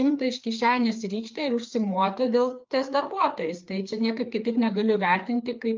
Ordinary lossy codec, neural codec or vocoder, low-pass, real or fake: Opus, 24 kbps; codec, 16 kHz, 4 kbps, FreqCodec, larger model; 7.2 kHz; fake